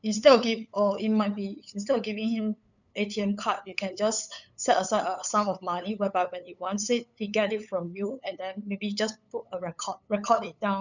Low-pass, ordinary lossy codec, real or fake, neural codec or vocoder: 7.2 kHz; none; fake; codec, 16 kHz, 8 kbps, FunCodec, trained on LibriTTS, 25 frames a second